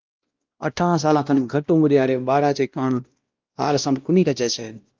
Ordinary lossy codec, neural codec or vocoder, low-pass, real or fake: Opus, 24 kbps; codec, 16 kHz, 1 kbps, X-Codec, WavLM features, trained on Multilingual LibriSpeech; 7.2 kHz; fake